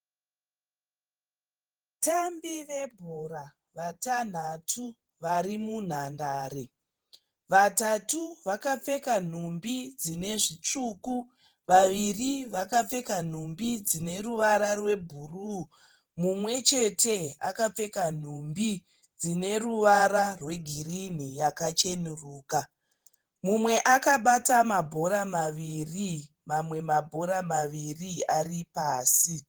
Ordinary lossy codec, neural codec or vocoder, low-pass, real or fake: Opus, 16 kbps; vocoder, 48 kHz, 128 mel bands, Vocos; 19.8 kHz; fake